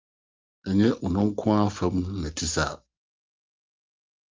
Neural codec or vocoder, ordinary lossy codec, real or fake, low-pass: none; Opus, 32 kbps; real; 7.2 kHz